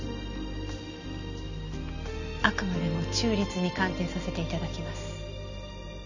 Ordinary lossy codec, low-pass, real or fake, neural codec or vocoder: none; 7.2 kHz; real; none